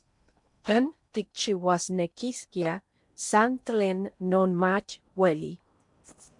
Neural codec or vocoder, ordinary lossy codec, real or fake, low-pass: codec, 16 kHz in and 24 kHz out, 0.8 kbps, FocalCodec, streaming, 65536 codes; MP3, 64 kbps; fake; 10.8 kHz